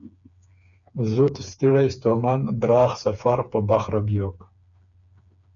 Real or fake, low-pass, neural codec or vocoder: fake; 7.2 kHz; codec, 16 kHz, 4 kbps, FreqCodec, smaller model